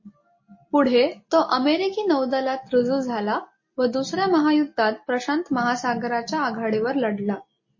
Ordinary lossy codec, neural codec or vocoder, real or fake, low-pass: MP3, 32 kbps; none; real; 7.2 kHz